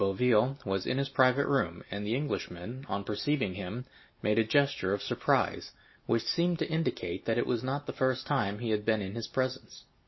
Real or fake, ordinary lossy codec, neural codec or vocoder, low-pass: real; MP3, 24 kbps; none; 7.2 kHz